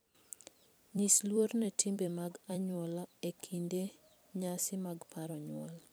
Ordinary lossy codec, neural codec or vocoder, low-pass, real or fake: none; vocoder, 44.1 kHz, 128 mel bands every 256 samples, BigVGAN v2; none; fake